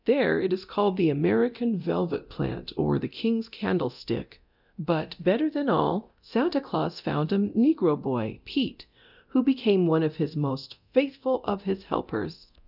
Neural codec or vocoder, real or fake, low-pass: codec, 24 kHz, 0.9 kbps, DualCodec; fake; 5.4 kHz